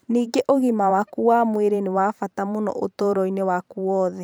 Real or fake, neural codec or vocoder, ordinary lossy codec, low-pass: fake; vocoder, 44.1 kHz, 128 mel bands every 512 samples, BigVGAN v2; none; none